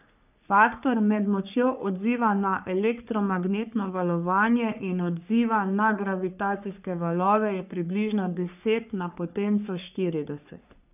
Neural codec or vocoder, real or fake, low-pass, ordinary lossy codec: codec, 44.1 kHz, 3.4 kbps, Pupu-Codec; fake; 3.6 kHz; none